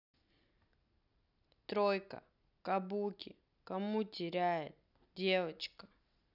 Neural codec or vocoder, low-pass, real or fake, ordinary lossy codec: none; 5.4 kHz; real; none